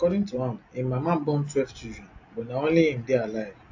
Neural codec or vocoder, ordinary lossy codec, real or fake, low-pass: none; AAC, 48 kbps; real; 7.2 kHz